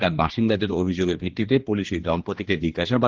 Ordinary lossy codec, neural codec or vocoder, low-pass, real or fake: Opus, 16 kbps; codec, 16 kHz, 2 kbps, X-Codec, HuBERT features, trained on general audio; 7.2 kHz; fake